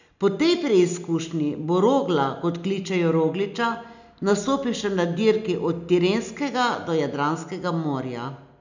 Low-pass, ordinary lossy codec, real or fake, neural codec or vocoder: 7.2 kHz; none; real; none